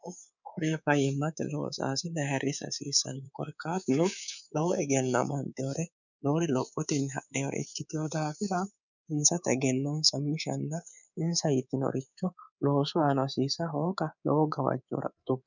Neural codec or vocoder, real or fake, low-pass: codec, 24 kHz, 3.1 kbps, DualCodec; fake; 7.2 kHz